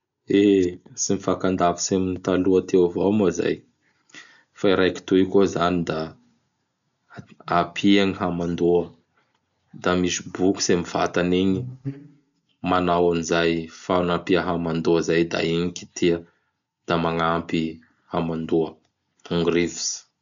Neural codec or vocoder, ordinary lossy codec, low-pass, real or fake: none; none; 7.2 kHz; real